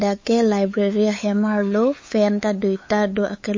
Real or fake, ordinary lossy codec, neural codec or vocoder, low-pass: fake; MP3, 32 kbps; codec, 16 kHz, 8 kbps, FunCodec, trained on LibriTTS, 25 frames a second; 7.2 kHz